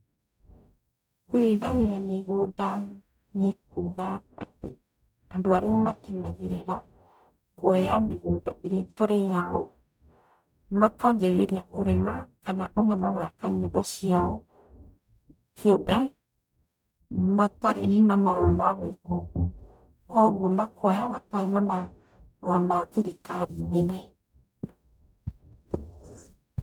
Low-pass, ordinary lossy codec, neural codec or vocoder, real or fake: 19.8 kHz; none; codec, 44.1 kHz, 0.9 kbps, DAC; fake